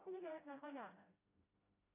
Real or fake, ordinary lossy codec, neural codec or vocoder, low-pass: fake; AAC, 16 kbps; codec, 16 kHz, 0.5 kbps, FreqCodec, smaller model; 3.6 kHz